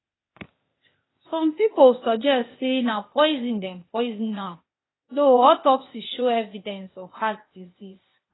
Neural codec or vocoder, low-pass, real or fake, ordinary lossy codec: codec, 16 kHz, 0.8 kbps, ZipCodec; 7.2 kHz; fake; AAC, 16 kbps